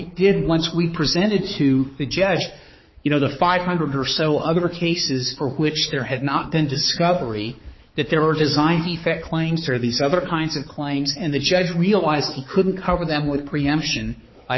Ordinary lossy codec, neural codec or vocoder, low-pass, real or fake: MP3, 24 kbps; codec, 16 kHz, 4 kbps, X-Codec, HuBERT features, trained on balanced general audio; 7.2 kHz; fake